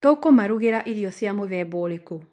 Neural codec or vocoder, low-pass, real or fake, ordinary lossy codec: codec, 24 kHz, 0.9 kbps, WavTokenizer, medium speech release version 2; 10.8 kHz; fake; none